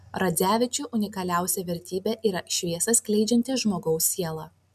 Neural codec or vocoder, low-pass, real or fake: none; 14.4 kHz; real